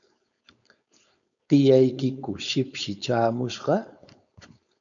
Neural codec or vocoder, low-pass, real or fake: codec, 16 kHz, 4.8 kbps, FACodec; 7.2 kHz; fake